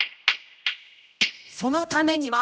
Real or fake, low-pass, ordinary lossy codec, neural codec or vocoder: fake; none; none; codec, 16 kHz, 0.5 kbps, X-Codec, HuBERT features, trained on general audio